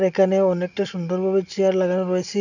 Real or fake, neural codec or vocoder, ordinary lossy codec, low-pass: real; none; none; 7.2 kHz